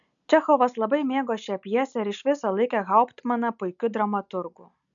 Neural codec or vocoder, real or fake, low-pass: none; real; 7.2 kHz